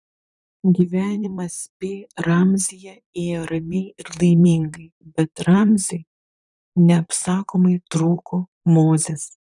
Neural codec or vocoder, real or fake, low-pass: vocoder, 44.1 kHz, 128 mel bands, Pupu-Vocoder; fake; 10.8 kHz